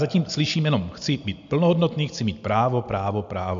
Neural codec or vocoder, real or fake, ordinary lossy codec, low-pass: none; real; MP3, 64 kbps; 7.2 kHz